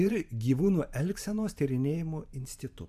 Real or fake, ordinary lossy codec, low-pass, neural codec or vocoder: fake; AAC, 96 kbps; 14.4 kHz; vocoder, 44.1 kHz, 128 mel bands every 512 samples, BigVGAN v2